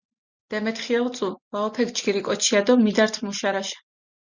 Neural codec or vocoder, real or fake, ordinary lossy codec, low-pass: none; real; Opus, 64 kbps; 7.2 kHz